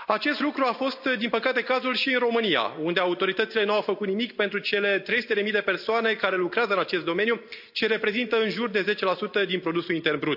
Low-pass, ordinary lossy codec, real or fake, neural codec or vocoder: 5.4 kHz; AAC, 48 kbps; real; none